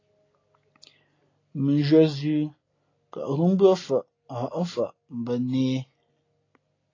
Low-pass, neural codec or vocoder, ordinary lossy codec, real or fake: 7.2 kHz; none; AAC, 32 kbps; real